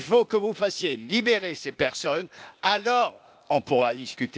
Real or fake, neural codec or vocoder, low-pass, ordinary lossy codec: fake; codec, 16 kHz, 0.8 kbps, ZipCodec; none; none